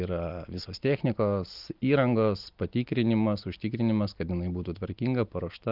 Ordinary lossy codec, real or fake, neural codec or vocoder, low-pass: Opus, 32 kbps; real; none; 5.4 kHz